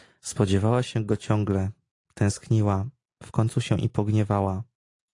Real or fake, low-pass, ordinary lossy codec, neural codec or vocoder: real; 10.8 kHz; AAC, 48 kbps; none